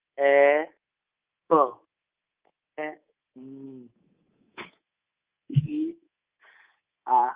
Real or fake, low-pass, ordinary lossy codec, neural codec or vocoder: fake; 3.6 kHz; Opus, 24 kbps; codec, 24 kHz, 3.1 kbps, DualCodec